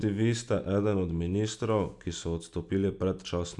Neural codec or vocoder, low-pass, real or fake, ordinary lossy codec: none; 10.8 kHz; real; none